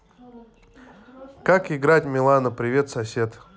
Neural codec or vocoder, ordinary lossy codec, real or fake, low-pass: none; none; real; none